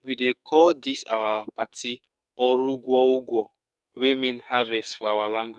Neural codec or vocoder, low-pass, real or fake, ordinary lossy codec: codec, 44.1 kHz, 2.6 kbps, SNAC; 10.8 kHz; fake; none